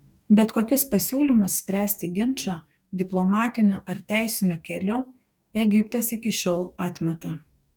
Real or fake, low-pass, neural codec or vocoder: fake; 19.8 kHz; codec, 44.1 kHz, 2.6 kbps, DAC